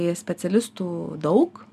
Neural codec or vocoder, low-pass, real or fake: none; 14.4 kHz; real